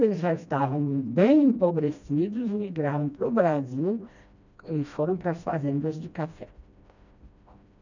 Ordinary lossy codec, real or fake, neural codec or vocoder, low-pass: none; fake; codec, 16 kHz, 1 kbps, FreqCodec, smaller model; 7.2 kHz